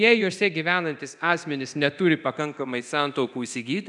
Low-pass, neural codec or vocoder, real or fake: 10.8 kHz; codec, 24 kHz, 0.9 kbps, DualCodec; fake